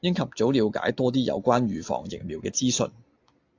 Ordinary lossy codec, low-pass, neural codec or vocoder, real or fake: AAC, 48 kbps; 7.2 kHz; none; real